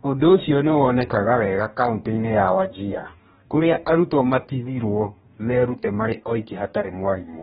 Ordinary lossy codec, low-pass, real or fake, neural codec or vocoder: AAC, 16 kbps; 19.8 kHz; fake; codec, 44.1 kHz, 2.6 kbps, DAC